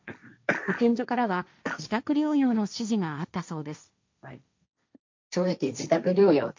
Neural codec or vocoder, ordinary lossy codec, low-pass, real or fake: codec, 16 kHz, 1.1 kbps, Voila-Tokenizer; MP3, 64 kbps; 7.2 kHz; fake